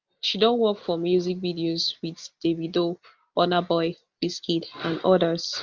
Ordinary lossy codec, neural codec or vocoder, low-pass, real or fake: Opus, 24 kbps; none; 7.2 kHz; real